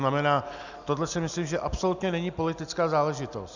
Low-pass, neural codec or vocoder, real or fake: 7.2 kHz; none; real